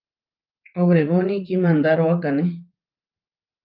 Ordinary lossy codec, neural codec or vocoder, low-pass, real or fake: Opus, 32 kbps; vocoder, 44.1 kHz, 80 mel bands, Vocos; 5.4 kHz; fake